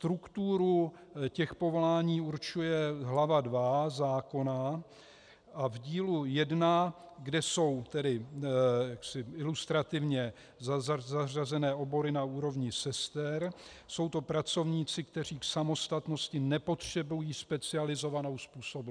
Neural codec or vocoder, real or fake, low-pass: none; real; 9.9 kHz